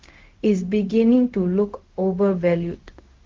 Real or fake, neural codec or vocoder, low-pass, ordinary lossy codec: fake; codec, 16 kHz, 0.4 kbps, LongCat-Audio-Codec; 7.2 kHz; Opus, 16 kbps